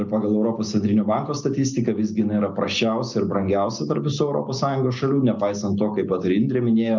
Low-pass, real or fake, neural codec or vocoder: 7.2 kHz; real; none